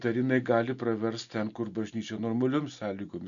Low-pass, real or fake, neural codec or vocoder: 7.2 kHz; real; none